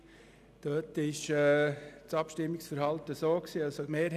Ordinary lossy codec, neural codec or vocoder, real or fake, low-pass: none; none; real; 14.4 kHz